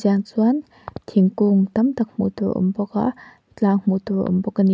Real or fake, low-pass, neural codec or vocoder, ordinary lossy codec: real; none; none; none